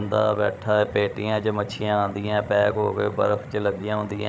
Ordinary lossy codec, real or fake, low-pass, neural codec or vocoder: none; fake; none; codec, 16 kHz, 16 kbps, FreqCodec, larger model